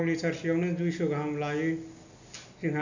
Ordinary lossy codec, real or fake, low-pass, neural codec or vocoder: none; real; 7.2 kHz; none